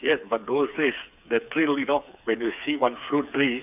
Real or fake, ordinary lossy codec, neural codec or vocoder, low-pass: fake; none; codec, 16 kHz, 4 kbps, FreqCodec, smaller model; 3.6 kHz